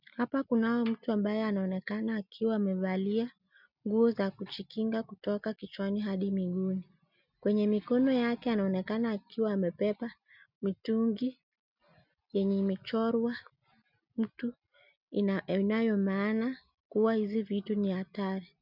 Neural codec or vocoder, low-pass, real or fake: none; 5.4 kHz; real